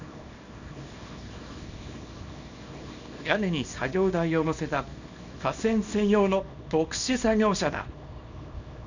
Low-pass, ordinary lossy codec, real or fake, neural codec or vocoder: 7.2 kHz; none; fake; codec, 24 kHz, 0.9 kbps, WavTokenizer, small release